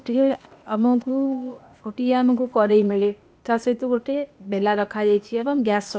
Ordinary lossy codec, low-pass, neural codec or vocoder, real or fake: none; none; codec, 16 kHz, 0.8 kbps, ZipCodec; fake